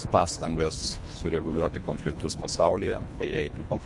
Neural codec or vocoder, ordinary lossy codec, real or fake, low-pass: codec, 24 kHz, 1.5 kbps, HILCodec; MP3, 64 kbps; fake; 10.8 kHz